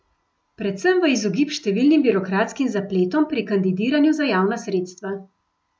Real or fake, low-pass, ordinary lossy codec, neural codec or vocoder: real; none; none; none